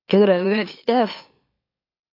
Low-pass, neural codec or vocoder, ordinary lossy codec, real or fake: 5.4 kHz; autoencoder, 44.1 kHz, a latent of 192 numbers a frame, MeloTTS; AAC, 32 kbps; fake